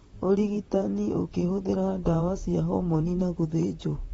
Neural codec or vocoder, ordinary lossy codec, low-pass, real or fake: vocoder, 44.1 kHz, 128 mel bands, Pupu-Vocoder; AAC, 24 kbps; 19.8 kHz; fake